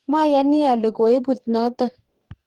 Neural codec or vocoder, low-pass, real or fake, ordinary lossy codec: codec, 44.1 kHz, 2.6 kbps, DAC; 19.8 kHz; fake; Opus, 24 kbps